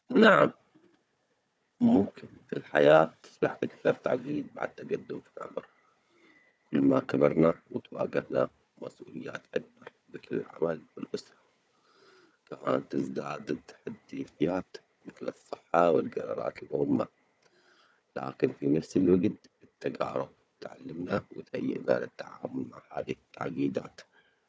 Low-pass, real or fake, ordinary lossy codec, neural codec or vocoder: none; fake; none; codec, 16 kHz, 4 kbps, FunCodec, trained on Chinese and English, 50 frames a second